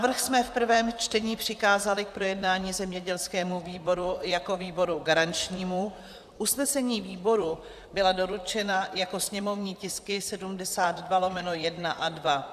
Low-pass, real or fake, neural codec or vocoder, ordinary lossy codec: 14.4 kHz; fake; vocoder, 44.1 kHz, 128 mel bands, Pupu-Vocoder; AAC, 96 kbps